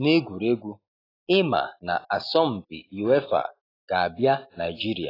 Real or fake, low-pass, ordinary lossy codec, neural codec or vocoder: real; 5.4 kHz; AAC, 24 kbps; none